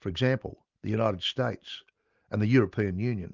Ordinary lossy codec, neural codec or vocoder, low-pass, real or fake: Opus, 32 kbps; none; 7.2 kHz; real